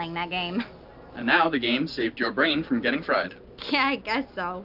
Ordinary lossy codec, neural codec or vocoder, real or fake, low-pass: AAC, 48 kbps; none; real; 5.4 kHz